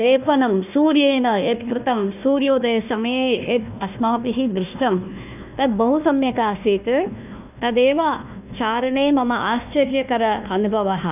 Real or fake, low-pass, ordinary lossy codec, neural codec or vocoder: fake; 3.6 kHz; none; codec, 16 kHz, 1 kbps, FunCodec, trained on Chinese and English, 50 frames a second